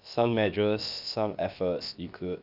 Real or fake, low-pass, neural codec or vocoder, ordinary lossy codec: fake; 5.4 kHz; codec, 16 kHz, about 1 kbps, DyCAST, with the encoder's durations; none